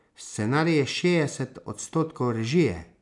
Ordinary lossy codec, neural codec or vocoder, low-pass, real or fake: none; none; 10.8 kHz; real